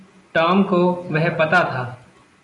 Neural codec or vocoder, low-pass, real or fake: none; 10.8 kHz; real